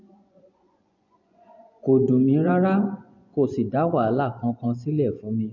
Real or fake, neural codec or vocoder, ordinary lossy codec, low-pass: fake; vocoder, 24 kHz, 100 mel bands, Vocos; MP3, 48 kbps; 7.2 kHz